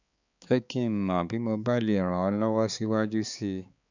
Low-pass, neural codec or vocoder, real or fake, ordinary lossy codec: 7.2 kHz; codec, 16 kHz, 4 kbps, X-Codec, HuBERT features, trained on balanced general audio; fake; none